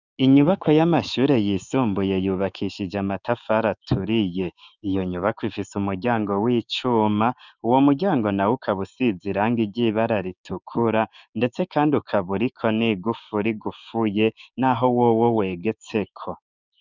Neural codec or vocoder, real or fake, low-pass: codec, 16 kHz, 6 kbps, DAC; fake; 7.2 kHz